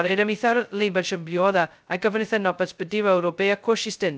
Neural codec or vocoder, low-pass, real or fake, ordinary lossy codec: codec, 16 kHz, 0.2 kbps, FocalCodec; none; fake; none